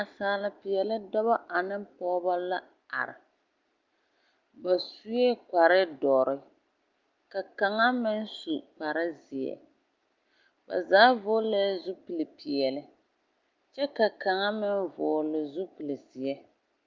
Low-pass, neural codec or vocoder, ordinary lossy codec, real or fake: 7.2 kHz; none; Opus, 24 kbps; real